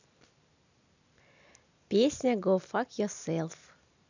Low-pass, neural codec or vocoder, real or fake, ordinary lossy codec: 7.2 kHz; none; real; none